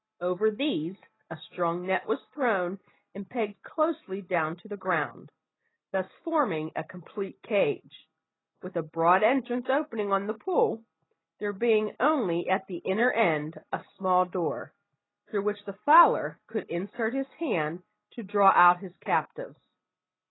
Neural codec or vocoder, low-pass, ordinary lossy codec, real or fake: none; 7.2 kHz; AAC, 16 kbps; real